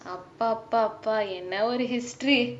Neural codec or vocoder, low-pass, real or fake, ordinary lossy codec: none; none; real; none